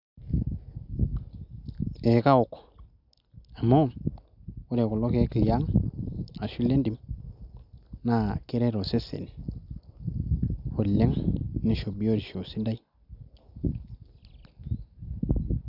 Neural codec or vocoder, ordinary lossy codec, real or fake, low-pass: none; none; real; 5.4 kHz